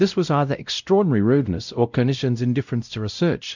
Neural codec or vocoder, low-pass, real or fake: codec, 16 kHz, 0.5 kbps, X-Codec, WavLM features, trained on Multilingual LibriSpeech; 7.2 kHz; fake